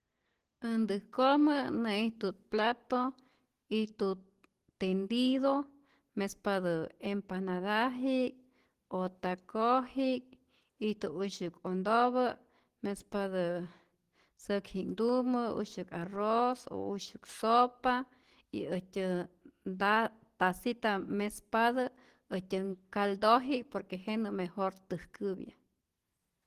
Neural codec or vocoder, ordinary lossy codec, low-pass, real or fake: none; Opus, 16 kbps; 14.4 kHz; real